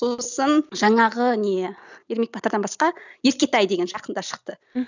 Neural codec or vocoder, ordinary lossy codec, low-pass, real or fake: none; none; 7.2 kHz; real